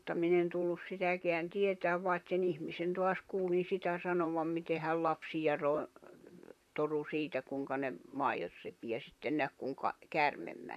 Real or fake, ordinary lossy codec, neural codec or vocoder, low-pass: fake; none; vocoder, 44.1 kHz, 128 mel bands, Pupu-Vocoder; 14.4 kHz